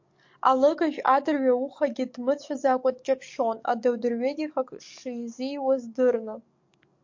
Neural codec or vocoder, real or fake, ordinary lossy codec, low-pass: codec, 44.1 kHz, 7.8 kbps, DAC; fake; MP3, 48 kbps; 7.2 kHz